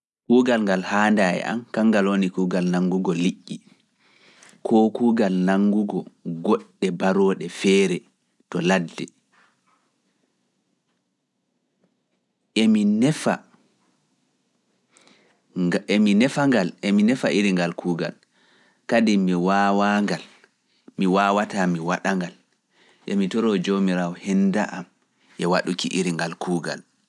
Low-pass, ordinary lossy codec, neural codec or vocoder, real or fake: none; none; none; real